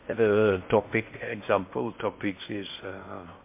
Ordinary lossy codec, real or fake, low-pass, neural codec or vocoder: MP3, 24 kbps; fake; 3.6 kHz; codec, 16 kHz in and 24 kHz out, 0.6 kbps, FocalCodec, streaming, 4096 codes